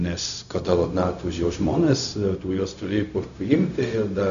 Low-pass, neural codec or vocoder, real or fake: 7.2 kHz; codec, 16 kHz, 0.4 kbps, LongCat-Audio-Codec; fake